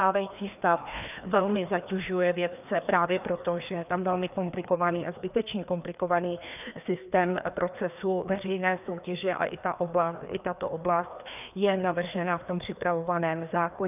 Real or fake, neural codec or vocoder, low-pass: fake; codec, 16 kHz, 2 kbps, FreqCodec, larger model; 3.6 kHz